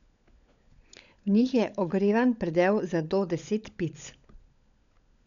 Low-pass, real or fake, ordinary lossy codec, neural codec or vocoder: 7.2 kHz; fake; none; codec, 16 kHz, 16 kbps, FunCodec, trained on LibriTTS, 50 frames a second